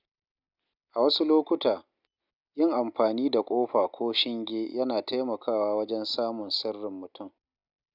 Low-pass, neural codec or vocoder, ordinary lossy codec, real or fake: 5.4 kHz; none; none; real